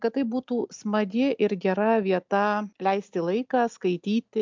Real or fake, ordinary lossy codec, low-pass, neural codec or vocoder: real; MP3, 64 kbps; 7.2 kHz; none